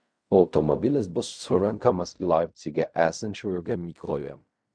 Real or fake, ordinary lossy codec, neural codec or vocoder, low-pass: fake; MP3, 96 kbps; codec, 16 kHz in and 24 kHz out, 0.4 kbps, LongCat-Audio-Codec, fine tuned four codebook decoder; 9.9 kHz